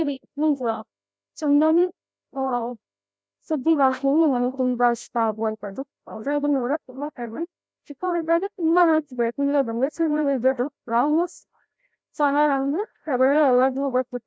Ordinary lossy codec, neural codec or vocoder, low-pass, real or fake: none; codec, 16 kHz, 0.5 kbps, FreqCodec, larger model; none; fake